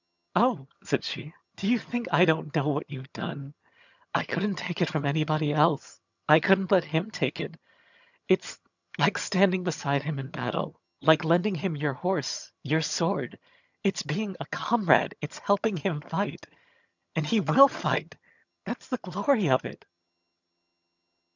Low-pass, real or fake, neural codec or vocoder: 7.2 kHz; fake; vocoder, 22.05 kHz, 80 mel bands, HiFi-GAN